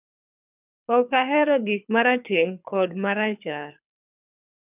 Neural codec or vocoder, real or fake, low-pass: codec, 24 kHz, 6 kbps, HILCodec; fake; 3.6 kHz